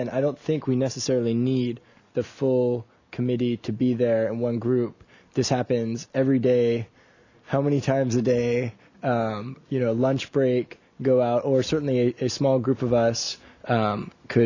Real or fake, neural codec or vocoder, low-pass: real; none; 7.2 kHz